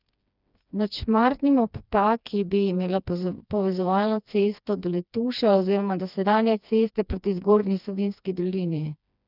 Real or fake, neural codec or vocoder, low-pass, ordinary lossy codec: fake; codec, 16 kHz, 2 kbps, FreqCodec, smaller model; 5.4 kHz; none